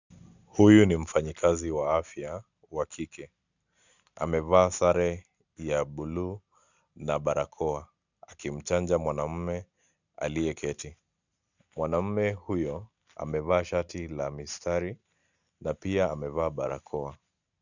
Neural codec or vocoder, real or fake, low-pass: none; real; 7.2 kHz